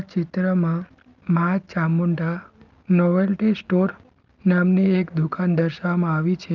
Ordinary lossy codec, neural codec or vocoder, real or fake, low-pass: Opus, 24 kbps; none; real; 7.2 kHz